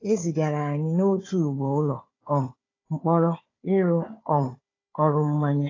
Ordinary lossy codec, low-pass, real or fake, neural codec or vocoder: AAC, 32 kbps; 7.2 kHz; fake; codec, 16 kHz, 4 kbps, FunCodec, trained on Chinese and English, 50 frames a second